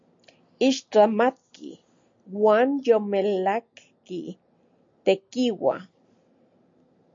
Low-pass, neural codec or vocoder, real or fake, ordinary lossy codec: 7.2 kHz; none; real; MP3, 48 kbps